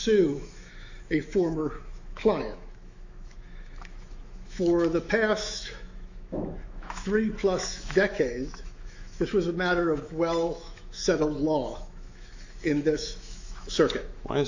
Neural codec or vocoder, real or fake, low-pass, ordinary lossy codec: autoencoder, 48 kHz, 128 numbers a frame, DAC-VAE, trained on Japanese speech; fake; 7.2 kHz; AAC, 48 kbps